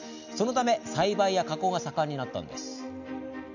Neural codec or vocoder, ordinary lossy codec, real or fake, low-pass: none; none; real; 7.2 kHz